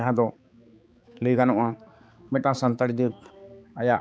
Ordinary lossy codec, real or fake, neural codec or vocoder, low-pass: none; fake; codec, 16 kHz, 4 kbps, X-Codec, HuBERT features, trained on balanced general audio; none